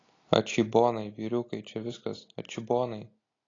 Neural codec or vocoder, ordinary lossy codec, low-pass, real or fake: none; AAC, 32 kbps; 7.2 kHz; real